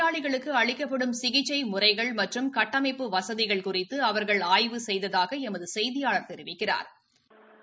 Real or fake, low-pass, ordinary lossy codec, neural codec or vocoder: real; none; none; none